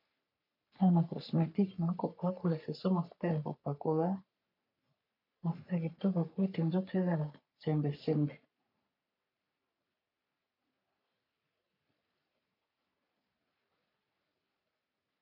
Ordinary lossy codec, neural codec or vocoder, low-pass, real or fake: MP3, 48 kbps; codec, 44.1 kHz, 3.4 kbps, Pupu-Codec; 5.4 kHz; fake